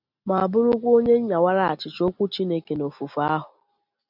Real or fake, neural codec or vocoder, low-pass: real; none; 5.4 kHz